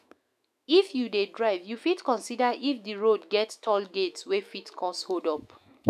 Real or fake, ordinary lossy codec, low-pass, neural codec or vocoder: fake; none; 14.4 kHz; autoencoder, 48 kHz, 128 numbers a frame, DAC-VAE, trained on Japanese speech